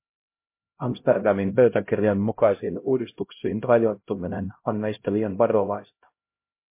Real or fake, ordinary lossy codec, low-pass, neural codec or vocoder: fake; MP3, 24 kbps; 3.6 kHz; codec, 16 kHz, 0.5 kbps, X-Codec, HuBERT features, trained on LibriSpeech